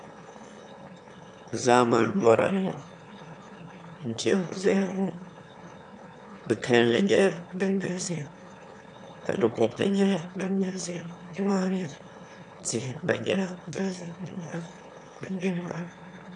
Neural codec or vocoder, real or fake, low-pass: autoencoder, 22.05 kHz, a latent of 192 numbers a frame, VITS, trained on one speaker; fake; 9.9 kHz